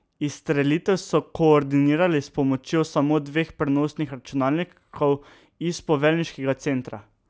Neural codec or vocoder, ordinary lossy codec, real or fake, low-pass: none; none; real; none